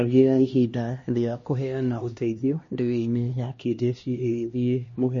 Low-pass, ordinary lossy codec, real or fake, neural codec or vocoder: 7.2 kHz; MP3, 32 kbps; fake; codec, 16 kHz, 1 kbps, X-Codec, HuBERT features, trained on LibriSpeech